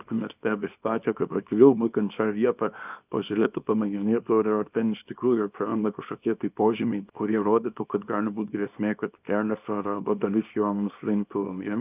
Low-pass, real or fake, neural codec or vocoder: 3.6 kHz; fake; codec, 24 kHz, 0.9 kbps, WavTokenizer, small release